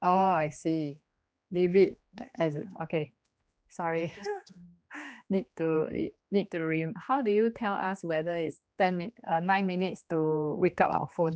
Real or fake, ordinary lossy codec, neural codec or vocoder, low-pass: fake; none; codec, 16 kHz, 2 kbps, X-Codec, HuBERT features, trained on general audio; none